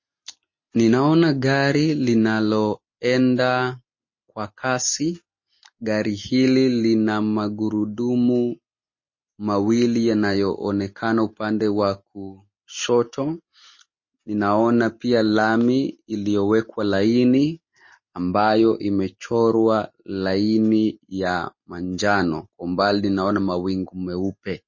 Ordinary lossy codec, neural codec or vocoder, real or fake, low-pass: MP3, 32 kbps; none; real; 7.2 kHz